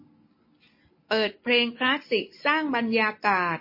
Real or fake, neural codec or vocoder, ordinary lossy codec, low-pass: real; none; MP3, 24 kbps; 5.4 kHz